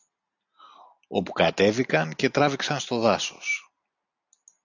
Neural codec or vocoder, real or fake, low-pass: none; real; 7.2 kHz